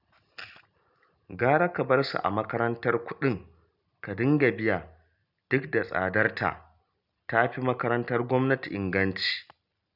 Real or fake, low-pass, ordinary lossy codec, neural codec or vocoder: real; 5.4 kHz; none; none